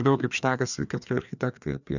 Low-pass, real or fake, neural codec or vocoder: 7.2 kHz; fake; codec, 16 kHz, 2 kbps, FreqCodec, larger model